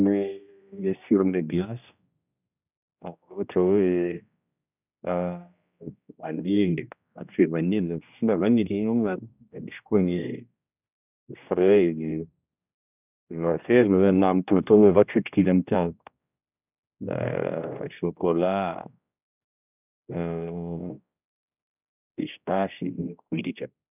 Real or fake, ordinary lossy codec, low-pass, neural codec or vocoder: fake; none; 3.6 kHz; codec, 16 kHz, 1 kbps, X-Codec, HuBERT features, trained on general audio